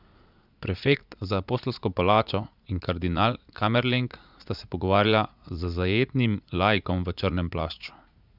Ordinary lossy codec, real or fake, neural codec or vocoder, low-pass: none; fake; vocoder, 44.1 kHz, 80 mel bands, Vocos; 5.4 kHz